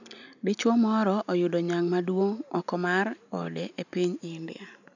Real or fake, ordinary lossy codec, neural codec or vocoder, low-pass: real; none; none; 7.2 kHz